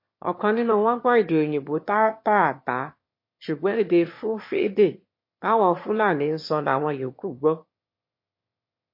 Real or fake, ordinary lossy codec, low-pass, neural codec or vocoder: fake; MP3, 32 kbps; 5.4 kHz; autoencoder, 22.05 kHz, a latent of 192 numbers a frame, VITS, trained on one speaker